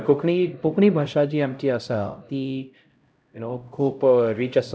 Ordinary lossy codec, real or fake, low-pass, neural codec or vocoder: none; fake; none; codec, 16 kHz, 0.5 kbps, X-Codec, HuBERT features, trained on LibriSpeech